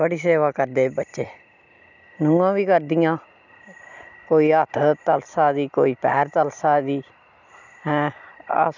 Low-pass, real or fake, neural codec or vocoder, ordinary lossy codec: 7.2 kHz; real; none; none